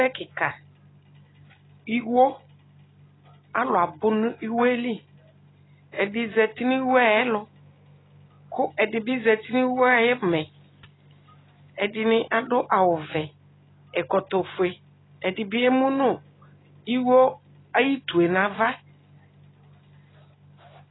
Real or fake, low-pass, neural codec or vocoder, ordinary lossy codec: real; 7.2 kHz; none; AAC, 16 kbps